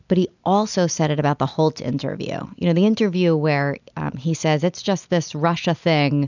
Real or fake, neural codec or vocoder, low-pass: real; none; 7.2 kHz